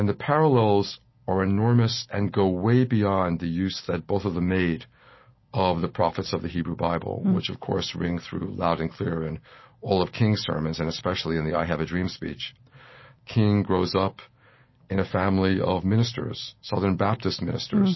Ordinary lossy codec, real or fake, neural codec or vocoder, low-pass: MP3, 24 kbps; real; none; 7.2 kHz